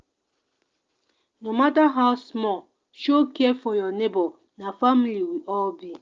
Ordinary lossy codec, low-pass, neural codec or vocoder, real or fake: Opus, 24 kbps; 7.2 kHz; none; real